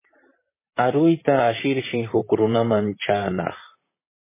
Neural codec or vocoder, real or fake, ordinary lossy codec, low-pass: vocoder, 44.1 kHz, 128 mel bands, Pupu-Vocoder; fake; MP3, 16 kbps; 3.6 kHz